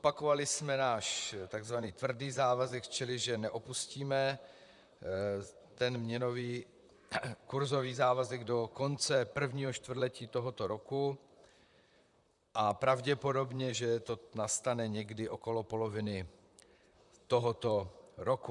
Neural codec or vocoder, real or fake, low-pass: vocoder, 44.1 kHz, 128 mel bands, Pupu-Vocoder; fake; 10.8 kHz